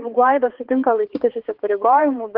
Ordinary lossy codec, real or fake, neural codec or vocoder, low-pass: Opus, 16 kbps; fake; codec, 16 kHz, 4 kbps, X-Codec, HuBERT features, trained on balanced general audio; 5.4 kHz